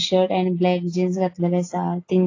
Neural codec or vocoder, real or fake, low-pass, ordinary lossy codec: none; real; 7.2 kHz; AAC, 32 kbps